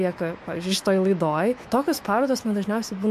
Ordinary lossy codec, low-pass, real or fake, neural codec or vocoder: MP3, 64 kbps; 14.4 kHz; fake; autoencoder, 48 kHz, 128 numbers a frame, DAC-VAE, trained on Japanese speech